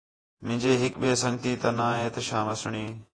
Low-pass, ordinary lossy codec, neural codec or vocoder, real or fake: 9.9 kHz; AAC, 32 kbps; vocoder, 48 kHz, 128 mel bands, Vocos; fake